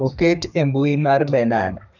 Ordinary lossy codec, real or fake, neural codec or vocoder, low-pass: none; fake; codec, 32 kHz, 1.9 kbps, SNAC; 7.2 kHz